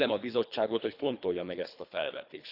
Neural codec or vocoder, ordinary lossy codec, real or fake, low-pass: codec, 24 kHz, 3 kbps, HILCodec; none; fake; 5.4 kHz